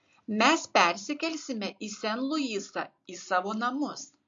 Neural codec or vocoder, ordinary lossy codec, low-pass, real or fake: none; MP3, 48 kbps; 7.2 kHz; real